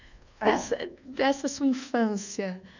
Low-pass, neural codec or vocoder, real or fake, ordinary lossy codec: 7.2 kHz; codec, 24 kHz, 1.2 kbps, DualCodec; fake; none